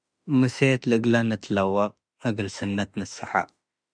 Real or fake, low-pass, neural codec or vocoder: fake; 9.9 kHz; autoencoder, 48 kHz, 32 numbers a frame, DAC-VAE, trained on Japanese speech